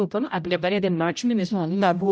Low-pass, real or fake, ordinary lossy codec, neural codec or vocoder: none; fake; none; codec, 16 kHz, 0.5 kbps, X-Codec, HuBERT features, trained on general audio